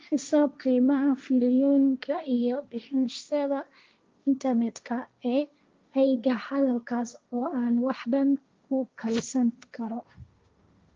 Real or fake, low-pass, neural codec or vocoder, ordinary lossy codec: fake; 7.2 kHz; codec, 16 kHz, 1.1 kbps, Voila-Tokenizer; Opus, 24 kbps